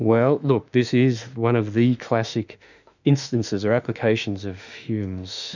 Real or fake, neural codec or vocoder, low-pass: fake; autoencoder, 48 kHz, 32 numbers a frame, DAC-VAE, trained on Japanese speech; 7.2 kHz